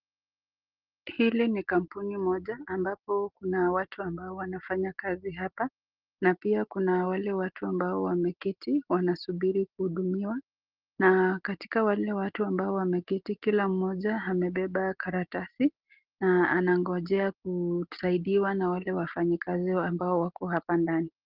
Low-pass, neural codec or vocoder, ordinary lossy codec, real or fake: 5.4 kHz; none; Opus, 16 kbps; real